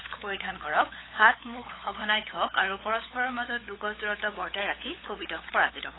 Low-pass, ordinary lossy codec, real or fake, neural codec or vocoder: 7.2 kHz; AAC, 16 kbps; fake; codec, 16 kHz, 8 kbps, FunCodec, trained on LibriTTS, 25 frames a second